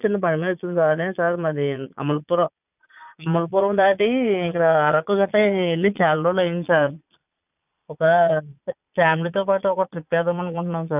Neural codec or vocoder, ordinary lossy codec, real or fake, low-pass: vocoder, 22.05 kHz, 80 mel bands, Vocos; none; fake; 3.6 kHz